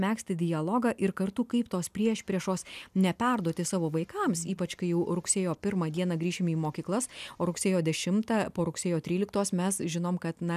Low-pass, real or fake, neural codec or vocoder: 14.4 kHz; real; none